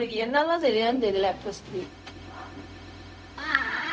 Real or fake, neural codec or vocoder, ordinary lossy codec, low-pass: fake; codec, 16 kHz, 0.4 kbps, LongCat-Audio-Codec; none; none